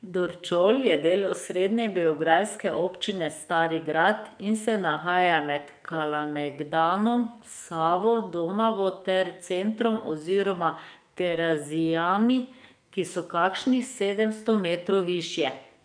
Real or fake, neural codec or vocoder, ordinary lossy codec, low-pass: fake; codec, 44.1 kHz, 2.6 kbps, SNAC; none; 9.9 kHz